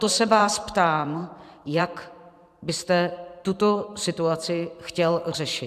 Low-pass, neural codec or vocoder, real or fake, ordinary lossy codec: 14.4 kHz; vocoder, 44.1 kHz, 128 mel bands every 512 samples, BigVGAN v2; fake; AAC, 96 kbps